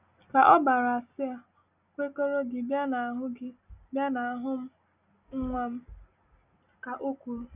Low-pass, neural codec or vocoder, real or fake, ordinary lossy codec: 3.6 kHz; none; real; none